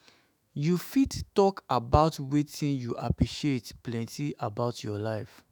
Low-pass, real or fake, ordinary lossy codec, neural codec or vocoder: none; fake; none; autoencoder, 48 kHz, 128 numbers a frame, DAC-VAE, trained on Japanese speech